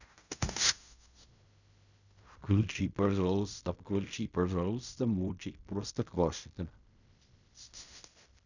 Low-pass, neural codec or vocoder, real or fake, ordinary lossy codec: 7.2 kHz; codec, 16 kHz in and 24 kHz out, 0.4 kbps, LongCat-Audio-Codec, fine tuned four codebook decoder; fake; none